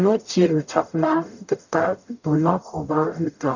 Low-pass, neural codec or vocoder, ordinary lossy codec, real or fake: 7.2 kHz; codec, 44.1 kHz, 0.9 kbps, DAC; none; fake